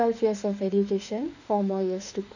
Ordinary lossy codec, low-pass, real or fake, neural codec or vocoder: none; 7.2 kHz; fake; autoencoder, 48 kHz, 32 numbers a frame, DAC-VAE, trained on Japanese speech